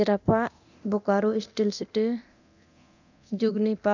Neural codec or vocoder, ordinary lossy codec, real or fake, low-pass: codec, 24 kHz, 0.9 kbps, DualCodec; none; fake; 7.2 kHz